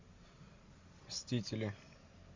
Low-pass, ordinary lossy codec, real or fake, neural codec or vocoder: 7.2 kHz; MP3, 48 kbps; real; none